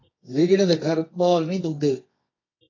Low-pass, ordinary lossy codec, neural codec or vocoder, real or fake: 7.2 kHz; AAC, 32 kbps; codec, 24 kHz, 0.9 kbps, WavTokenizer, medium music audio release; fake